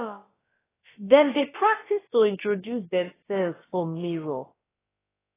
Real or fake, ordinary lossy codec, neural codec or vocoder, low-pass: fake; AAC, 16 kbps; codec, 16 kHz, about 1 kbps, DyCAST, with the encoder's durations; 3.6 kHz